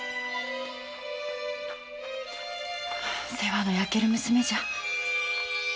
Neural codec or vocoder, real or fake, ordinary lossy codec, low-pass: none; real; none; none